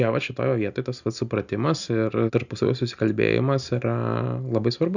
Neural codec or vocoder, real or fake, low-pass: none; real; 7.2 kHz